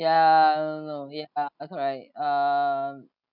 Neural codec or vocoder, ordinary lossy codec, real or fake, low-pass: none; none; real; 5.4 kHz